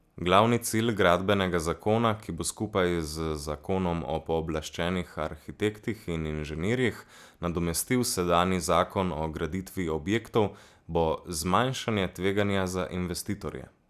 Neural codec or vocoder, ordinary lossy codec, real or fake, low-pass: none; none; real; 14.4 kHz